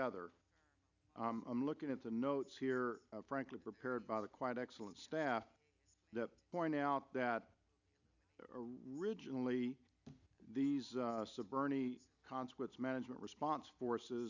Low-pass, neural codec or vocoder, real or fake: 7.2 kHz; none; real